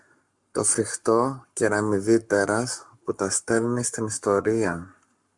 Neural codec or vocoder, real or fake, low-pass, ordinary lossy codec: codec, 44.1 kHz, 7.8 kbps, Pupu-Codec; fake; 10.8 kHz; MP3, 64 kbps